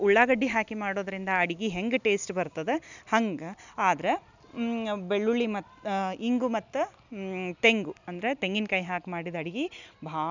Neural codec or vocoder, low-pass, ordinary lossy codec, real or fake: none; 7.2 kHz; none; real